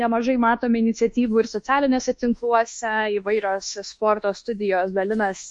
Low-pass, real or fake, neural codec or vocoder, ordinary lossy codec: 10.8 kHz; fake; codec, 24 kHz, 1.2 kbps, DualCodec; MP3, 48 kbps